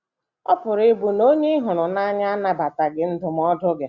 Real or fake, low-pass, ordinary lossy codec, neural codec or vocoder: real; 7.2 kHz; Opus, 64 kbps; none